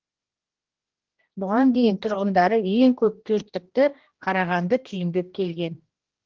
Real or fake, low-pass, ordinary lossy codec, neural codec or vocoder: fake; 7.2 kHz; Opus, 16 kbps; codec, 16 kHz, 1 kbps, X-Codec, HuBERT features, trained on general audio